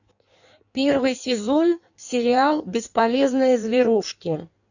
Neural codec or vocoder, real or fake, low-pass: codec, 16 kHz in and 24 kHz out, 1.1 kbps, FireRedTTS-2 codec; fake; 7.2 kHz